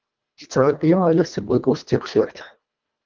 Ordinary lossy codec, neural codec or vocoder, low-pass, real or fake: Opus, 24 kbps; codec, 24 kHz, 1.5 kbps, HILCodec; 7.2 kHz; fake